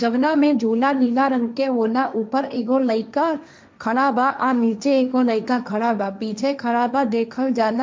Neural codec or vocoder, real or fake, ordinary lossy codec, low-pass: codec, 16 kHz, 1.1 kbps, Voila-Tokenizer; fake; none; none